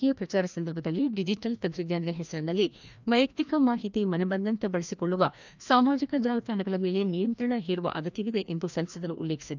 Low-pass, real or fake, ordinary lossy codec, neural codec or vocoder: 7.2 kHz; fake; none; codec, 16 kHz, 1 kbps, FreqCodec, larger model